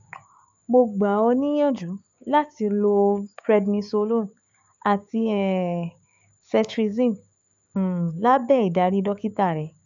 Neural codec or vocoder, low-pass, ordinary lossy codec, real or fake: codec, 16 kHz, 6 kbps, DAC; 7.2 kHz; none; fake